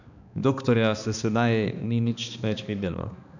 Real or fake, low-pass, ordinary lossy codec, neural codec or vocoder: fake; 7.2 kHz; none; codec, 16 kHz, 2 kbps, X-Codec, HuBERT features, trained on balanced general audio